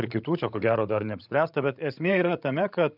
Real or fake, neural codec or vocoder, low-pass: fake; codec, 16 kHz, 16 kbps, FreqCodec, larger model; 5.4 kHz